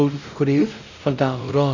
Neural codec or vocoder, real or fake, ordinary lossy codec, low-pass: codec, 16 kHz, 0.5 kbps, X-Codec, WavLM features, trained on Multilingual LibriSpeech; fake; none; 7.2 kHz